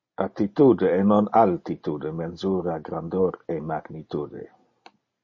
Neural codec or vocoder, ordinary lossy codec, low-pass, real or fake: none; MP3, 32 kbps; 7.2 kHz; real